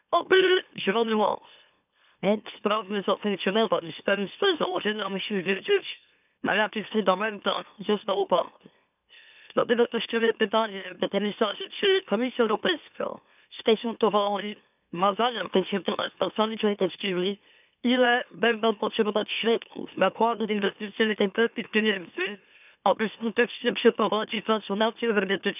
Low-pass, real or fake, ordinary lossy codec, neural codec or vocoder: 3.6 kHz; fake; none; autoencoder, 44.1 kHz, a latent of 192 numbers a frame, MeloTTS